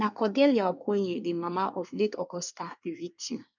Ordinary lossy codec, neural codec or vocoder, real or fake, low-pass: none; codec, 24 kHz, 1 kbps, SNAC; fake; 7.2 kHz